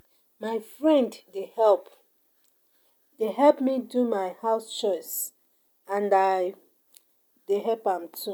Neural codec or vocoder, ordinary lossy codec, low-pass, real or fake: none; none; none; real